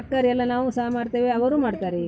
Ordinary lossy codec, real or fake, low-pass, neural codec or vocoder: none; real; none; none